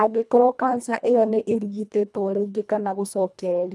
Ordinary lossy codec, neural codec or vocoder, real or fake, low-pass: none; codec, 24 kHz, 1.5 kbps, HILCodec; fake; none